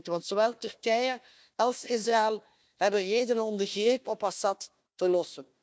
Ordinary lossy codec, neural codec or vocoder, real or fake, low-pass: none; codec, 16 kHz, 1 kbps, FunCodec, trained on Chinese and English, 50 frames a second; fake; none